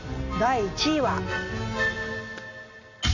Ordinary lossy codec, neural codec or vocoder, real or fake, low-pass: none; none; real; 7.2 kHz